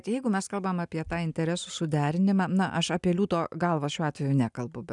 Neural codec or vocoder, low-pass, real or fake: none; 10.8 kHz; real